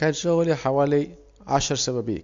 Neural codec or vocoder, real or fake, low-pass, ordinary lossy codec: none; real; 7.2 kHz; AAC, 64 kbps